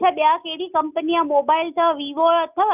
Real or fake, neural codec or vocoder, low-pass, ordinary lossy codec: real; none; 3.6 kHz; none